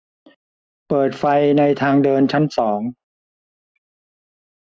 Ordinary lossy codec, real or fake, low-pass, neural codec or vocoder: none; real; none; none